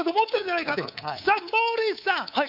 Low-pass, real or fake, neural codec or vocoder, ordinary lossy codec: 5.4 kHz; fake; codec, 24 kHz, 3.1 kbps, DualCodec; none